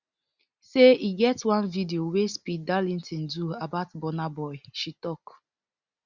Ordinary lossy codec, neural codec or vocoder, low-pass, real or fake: Opus, 64 kbps; none; 7.2 kHz; real